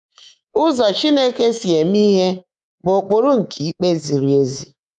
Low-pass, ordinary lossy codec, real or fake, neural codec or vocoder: 10.8 kHz; none; fake; codec, 24 kHz, 3.1 kbps, DualCodec